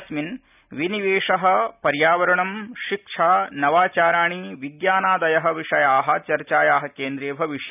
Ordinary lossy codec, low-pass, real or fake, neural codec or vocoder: none; 3.6 kHz; real; none